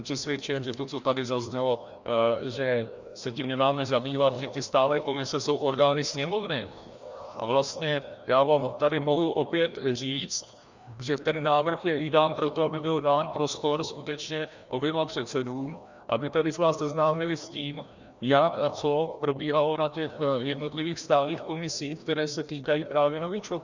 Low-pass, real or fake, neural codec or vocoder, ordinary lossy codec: 7.2 kHz; fake; codec, 16 kHz, 1 kbps, FreqCodec, larger model; Opus, 64 kbps